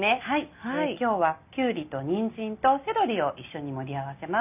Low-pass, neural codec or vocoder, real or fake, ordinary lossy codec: 3.6 kHz; none; real; none